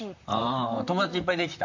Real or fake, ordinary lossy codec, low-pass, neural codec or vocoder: fake; MP3, 64 kbps; 7.2 kHz; vocoder, 44.1 kHz, 128 mel bands, Pupu-Vocoder